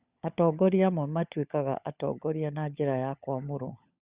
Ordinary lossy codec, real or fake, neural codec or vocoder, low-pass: Opus, 64 kbps; fake; codec, 16 kHz, 16 kbps, FunCodec, trained on LibriTTS, 50 frames a second; 3.6 kHz